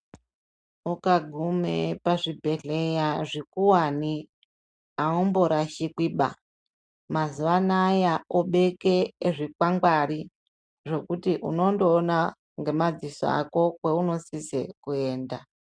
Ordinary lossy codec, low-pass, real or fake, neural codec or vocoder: MP3, 96 kbps; 9.9 kHz; real; none